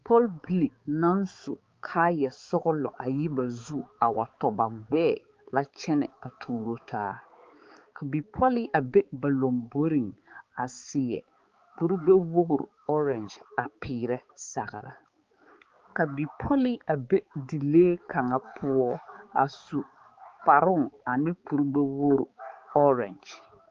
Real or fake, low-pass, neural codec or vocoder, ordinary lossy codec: fake; 7.2 kHz; codec, 16 kHz, 4 kbps, X-Codec, HuBERT features, trained on balanced general audio; Opus, 32 kbps